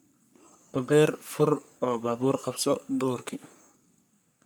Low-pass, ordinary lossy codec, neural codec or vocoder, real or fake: none; none; codec, 44.1 kHz, 3.4 kbps, Pupu-Codec; fake